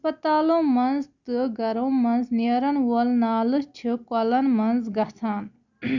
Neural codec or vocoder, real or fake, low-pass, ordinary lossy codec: none; real; 7.2 kHz; none